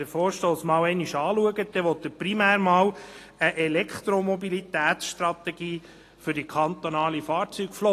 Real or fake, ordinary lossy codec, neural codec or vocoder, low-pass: real; AAC, 48 kbps; none; 14.4 kHz